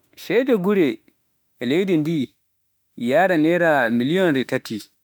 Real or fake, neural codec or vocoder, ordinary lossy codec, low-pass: fake; autoencoder, 48 kHz, 32 numbers a frame, DAC-VAE, trained on Japanese speech; none; none